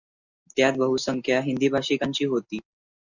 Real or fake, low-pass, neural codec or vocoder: real; 7.2 kHz; none